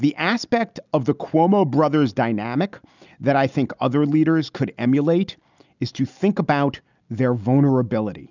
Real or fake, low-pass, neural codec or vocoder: real; 7.2 kHz; none